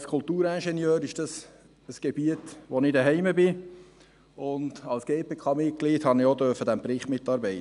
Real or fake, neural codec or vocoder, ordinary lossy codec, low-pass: real; none; none; 10.8 kHz